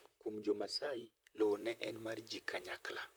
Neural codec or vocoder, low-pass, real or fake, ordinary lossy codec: vocoder, 44.1 kHz, 128 mel bands, Pupu-Vocoder; none; fake; none